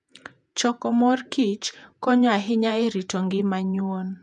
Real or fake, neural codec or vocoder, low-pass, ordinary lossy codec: fake; vocoder, 48 kHz, 128 mel bands, Vocos; 10.8 kHz; none